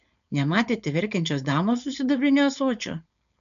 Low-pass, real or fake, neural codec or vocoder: 7.2 kHz; fake; codec, 16 kHz, 4.8 kbps, FACodec